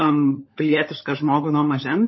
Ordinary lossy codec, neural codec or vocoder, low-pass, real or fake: MP3, 24 kbps; codec, 16 kHz, 16 kbps, FunCodec, trained on LibriTTS, 50 frames a second; 7.2 kHz; fake